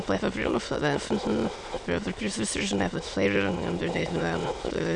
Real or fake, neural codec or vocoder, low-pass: fake; autoencoder, 22.05 kHz, a latent of 192 numbers a frame, VITS, trained on many speakers; 9.9 kHz